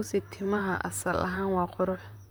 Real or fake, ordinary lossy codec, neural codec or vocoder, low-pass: fake; none; vocoder, 44.1 kHz, 128 mel bands, Pupu-Vocoder; none